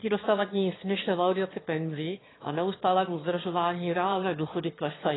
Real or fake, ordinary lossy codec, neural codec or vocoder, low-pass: fake; AAC, 16 kbps; autoencoder, 22.05 kHz, a latent of 192 numbers a frame, VITS, trained on one speaker; 7.2 kHz